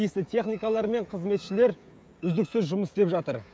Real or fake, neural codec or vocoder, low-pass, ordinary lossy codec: fake; codec, 16 kHz, 16 kbps, FreqCodec, smaller model; none; none